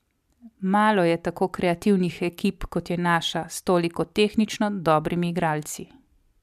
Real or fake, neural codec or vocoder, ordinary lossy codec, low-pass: real; none; MP3, 96 kbps; 14.4 kHz